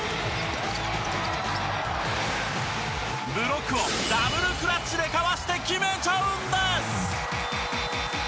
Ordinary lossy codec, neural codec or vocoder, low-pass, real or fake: none; none; none; real